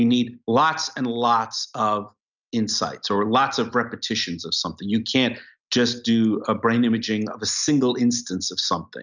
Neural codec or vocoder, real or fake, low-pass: none; real; 7.2 kHz